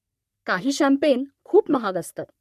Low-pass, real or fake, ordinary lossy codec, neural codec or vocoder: 14.4 kHz; fake; none; codec, 44.1 kHz, 3.4 kbps, Pupu-Codec